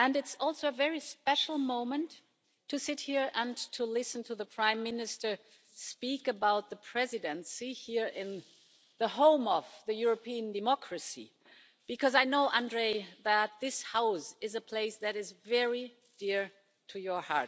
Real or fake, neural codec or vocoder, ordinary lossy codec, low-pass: real; none; none; none